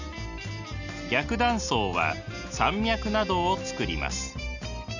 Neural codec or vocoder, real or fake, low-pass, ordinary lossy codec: none; real; 7.2 kHz; none